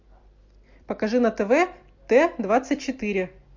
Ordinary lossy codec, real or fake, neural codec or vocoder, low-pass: MP3, 48 kbps; real; none; 7.2 kHz